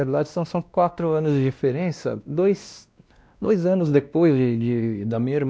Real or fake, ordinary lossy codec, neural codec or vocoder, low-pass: fake; none; codec, 16 kHz, 1 kbps, X-Codec, WavLM features, trained on Multilingual LibriSpeech; none